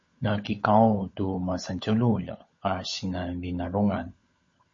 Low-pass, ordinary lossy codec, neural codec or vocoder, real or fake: 7.2 kHz; MP3, 32 kbps; codec, 16 kHz, 16 kbps, FunCodec, trained on LibriTTS, 50 frames a second; fake